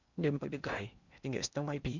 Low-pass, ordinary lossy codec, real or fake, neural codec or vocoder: 7.2 kHz; none; fake; codec, 16 kHz in and 24 kHz out, 0.8 kbps, FocalCodec, streaming, 65536 codes